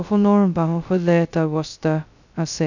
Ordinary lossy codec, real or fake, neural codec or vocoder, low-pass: none; fake; codec, 16 kHz, 0.2 kbps, FocalCodec; 7.2 kHz